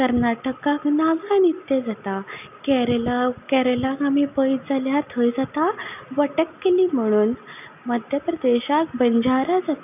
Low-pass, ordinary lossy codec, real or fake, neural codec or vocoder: 3.6 kHz; none; real; none